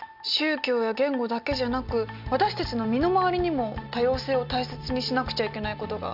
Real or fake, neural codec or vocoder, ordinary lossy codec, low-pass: real; none; none; 5.4 kHz